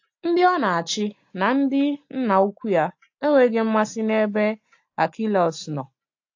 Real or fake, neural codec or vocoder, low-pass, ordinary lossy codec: real; none; 7.2 kHz; AAC, 48 kbps